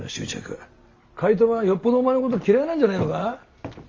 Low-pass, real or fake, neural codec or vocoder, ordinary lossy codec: 7.2 kHz; real; none; Opus, 32 kbps